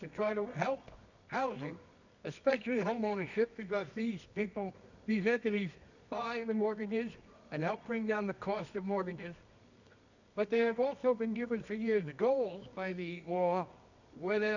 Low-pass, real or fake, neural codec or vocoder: 7.2 kHz; fake; codec, 24 kHz, 0.9 kbps, WavTokenizer, medium music audio release